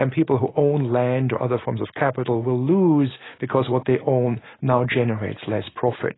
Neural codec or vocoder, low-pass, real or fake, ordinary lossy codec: none; 7.2 kHz; real; AAC, 16 kbps